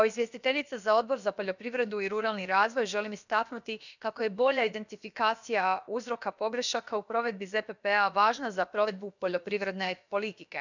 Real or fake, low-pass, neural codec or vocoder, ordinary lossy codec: fake; 7.2 kHz; codec, 16 kHz, about 1 kbps, DyCAST, with the encoder's durations; none